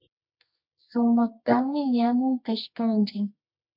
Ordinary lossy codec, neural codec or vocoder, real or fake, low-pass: MP3, 48 kbps; codec, 24 kHz, 0.9 kbps, WavTokenizer, medium music audio release; fake; 5.4 kHz